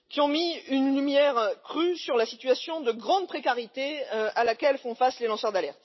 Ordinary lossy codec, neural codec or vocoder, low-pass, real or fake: MP3, 24 kbps; none; 7.2 kHz; real